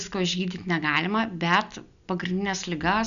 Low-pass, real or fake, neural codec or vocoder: 7.2 kHz; real; none